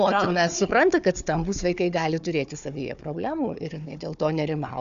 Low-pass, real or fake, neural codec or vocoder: 7.2 kHz; fake; codec, 16 kHz, 4 kbps, FunCodec, trained on Chinese and English, 50 frames a second